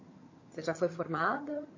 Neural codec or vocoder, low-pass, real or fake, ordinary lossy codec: vocoder, 22.05 kHz, 80 mel bands, HiFi-GAN; 7.2 kHz; fake; MP3, 32 kbps